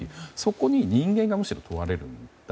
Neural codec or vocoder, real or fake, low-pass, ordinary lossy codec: none; real; none; none